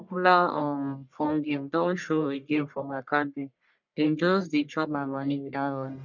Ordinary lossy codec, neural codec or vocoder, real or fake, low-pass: none; codec, 44.1 kHz, 1.7 kbps, Pupu-Codec; fake; 7.2 kHz